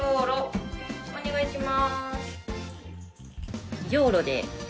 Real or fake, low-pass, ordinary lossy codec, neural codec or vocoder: real; none; none; none